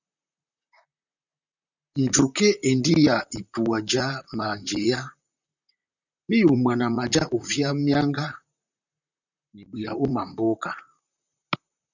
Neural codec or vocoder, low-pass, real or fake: vocoder, 44.1 kHz, 128 mel bands, Pupu-Vocoder; 7.2 kHz; fake